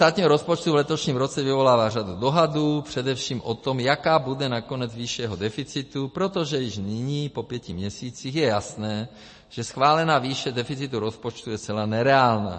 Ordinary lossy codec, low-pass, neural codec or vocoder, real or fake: MP3, 32 kbps; 9.9 kHz; none; real